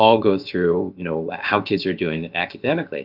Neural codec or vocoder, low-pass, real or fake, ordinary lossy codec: codec, 16 kHz, about 1 kbps, DyCAST, with the encoder's durations; 5.4 kHz; fake; Opus, 32 kbps